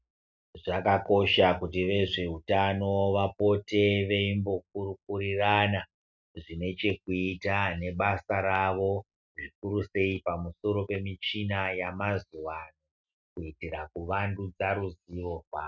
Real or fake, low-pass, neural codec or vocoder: real; 7.2 kHz; none